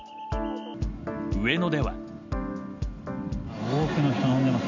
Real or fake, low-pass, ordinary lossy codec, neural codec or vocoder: real; 7.2 kHz; none; none